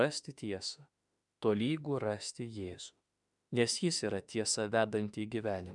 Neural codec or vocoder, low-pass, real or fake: autoencoder, 48 kHz, 32 numbers a frame, DAC-VAE, trained on Japanese speech; 10.8 kHz; fake